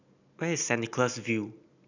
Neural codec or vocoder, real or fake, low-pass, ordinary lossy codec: none; real; 7.2 kHz; none